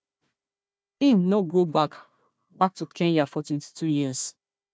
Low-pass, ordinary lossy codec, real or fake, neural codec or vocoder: none; none; fake; codec, 16 kHz, 1 kbps, FunCodec, trained on Chinese and English, 50 frames a second